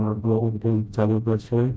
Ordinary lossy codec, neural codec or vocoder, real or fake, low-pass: none; codec, 16 kHz, 0.5 kbps, FreqCodec, smaller model; fake; none